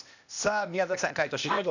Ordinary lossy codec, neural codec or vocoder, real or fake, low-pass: none; codec, 16 kHz, 0.8 kbps, ZipCodec; fake; 7.2 kHz